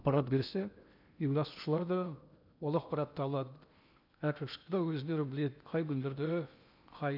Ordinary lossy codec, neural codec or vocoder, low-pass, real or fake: none; codec, 16 kHz in and 24 kHz out, 0.8 kbps, FocalCodec, streaming, 65536 codes; 5.4 kHz; fake